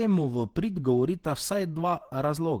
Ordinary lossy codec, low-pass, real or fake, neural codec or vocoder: Opus, 16 kbps; 19.8 kHz; fake; vocoder, 48 kHz, 128 mel bands, Vocos